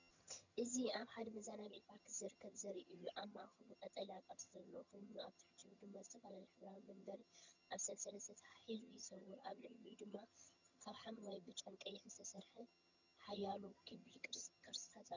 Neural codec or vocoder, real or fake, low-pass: vocoder, 22.05 kHz, 80 mel bands, HiFi-GAN; fake; 7.2 kHz